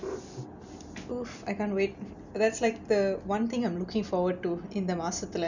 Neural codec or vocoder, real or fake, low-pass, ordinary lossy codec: none; real; 7.2 kHz; none